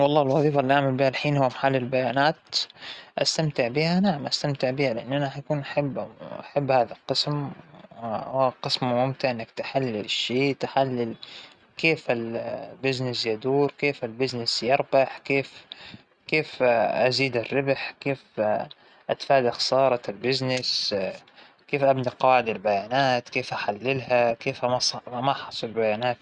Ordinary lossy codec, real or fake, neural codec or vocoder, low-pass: Opus, 64 kbps; real; none; 9.9 kHz